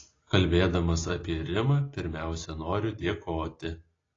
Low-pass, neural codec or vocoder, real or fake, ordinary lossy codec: 7.2 kHz; none; real; AAC, 32 kbps